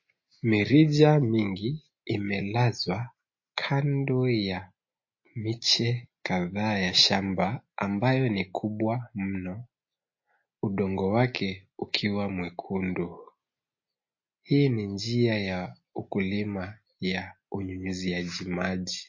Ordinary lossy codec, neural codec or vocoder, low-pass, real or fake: MP3, 32 kbps; none; 7.2 kHz; real